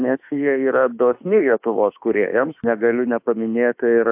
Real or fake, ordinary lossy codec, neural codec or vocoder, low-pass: fake; AAC, 32 kbps; autoencoder, 48 kHz, 32 numbers a frame, DAC-VAE, trained on Japanese speech; 3.6 kHz